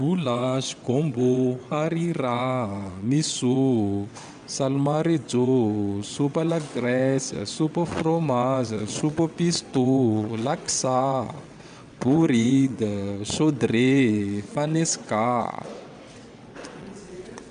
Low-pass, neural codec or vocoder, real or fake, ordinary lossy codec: 9.9 kHz; vocoder, 22.05 kHz, 80 mel bands, WaveNeXt; fake; none